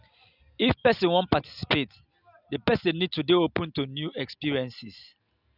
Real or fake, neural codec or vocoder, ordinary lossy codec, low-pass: real; none; none; 5.4 kHz